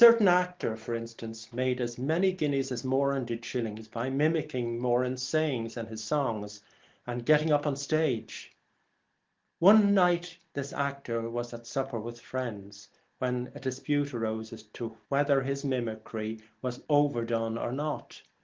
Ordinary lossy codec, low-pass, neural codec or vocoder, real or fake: Opus, 16 kbps; 7.2 kHz; none; real